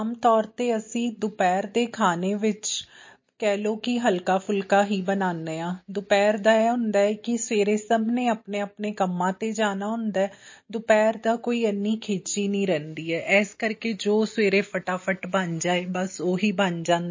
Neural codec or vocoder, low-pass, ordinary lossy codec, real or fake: none; 7.2 kHz; MP3, 32 kbps; real